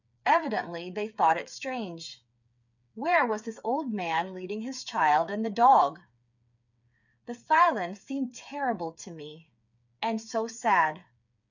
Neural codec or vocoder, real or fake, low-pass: codec, 16 kHz, 8 kbps, FreqCodec, smaller model; fake; 7.2 kHz